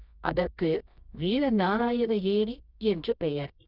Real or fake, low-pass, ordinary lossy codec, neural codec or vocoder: fake; 5.4 kHz; none; codec, 24 kHz, 0.9 kbps, WavTokenizer, medium music audio release